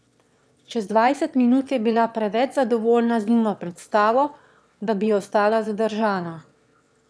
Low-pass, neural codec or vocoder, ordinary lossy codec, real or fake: none; autoencoder, 22.05 kHz, a latent of 192 numbers a frame, VITS, trained on one speaker; none; fake